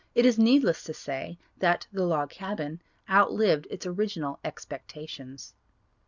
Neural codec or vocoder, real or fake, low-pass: none; real; 7.2 kHz